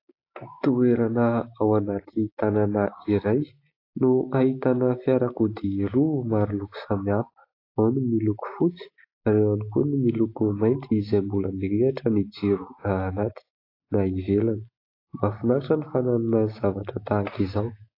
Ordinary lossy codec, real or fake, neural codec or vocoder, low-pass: AAC, 32 kbps; real; none; 5.4 kHz